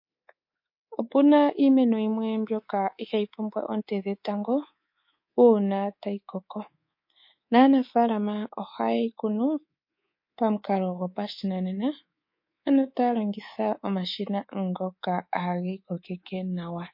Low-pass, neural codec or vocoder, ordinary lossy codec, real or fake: 5.4 kHz; codec, 24 kHz, 3.1 kbps, DualCodec; MP3, 32 kbps; fake